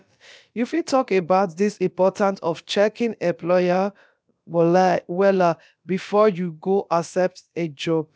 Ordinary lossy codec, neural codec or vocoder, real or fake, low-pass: none; codec, 16 kHz, about 1 kbps, DyCAST, with the encoder's durations; fake; none